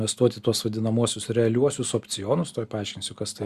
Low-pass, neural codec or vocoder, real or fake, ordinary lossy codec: 14.4 kHz; none; real; Opus, 64 kbps